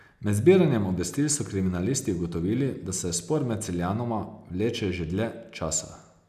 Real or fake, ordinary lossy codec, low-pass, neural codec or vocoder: real; none; 14.4 kHz; none